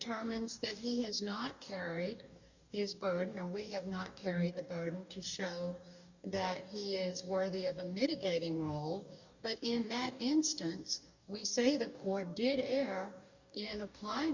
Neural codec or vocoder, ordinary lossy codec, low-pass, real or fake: codec, 44.1 kHz, 2.6 kbps, DAC; Opus, 64 kbps; 7.2 kHz; fake